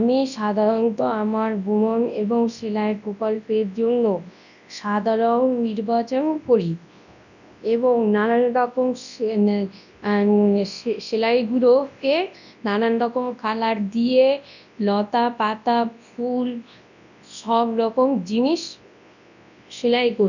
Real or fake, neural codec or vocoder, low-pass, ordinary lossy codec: fake; codec, 24 kHz, 0.9 kbps, WavTokenizer, large speech release; 7.2 kHz; none